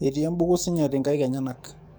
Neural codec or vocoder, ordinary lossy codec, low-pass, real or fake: codec, 44.1 kHz, 7.8 kbps, DAC; none; none; fake